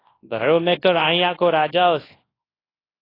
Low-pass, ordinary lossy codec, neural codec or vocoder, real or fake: 5.4 kHz; AAC, 24 kbps; codec, 24 kHz, 0.9 kbps, WavTokenizer, large speech release; fake